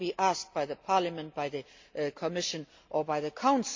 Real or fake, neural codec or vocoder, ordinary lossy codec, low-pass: real; none; MP3, 48 kbps; 7.2 kHz